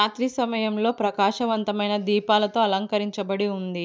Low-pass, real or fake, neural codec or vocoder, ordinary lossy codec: none; real; none; none